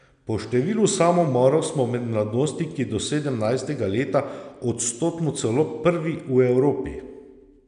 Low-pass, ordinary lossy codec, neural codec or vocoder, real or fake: 9.9 kHz; none; none; real